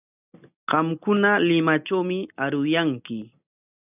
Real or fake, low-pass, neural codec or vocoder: real; 3.6 kHz; none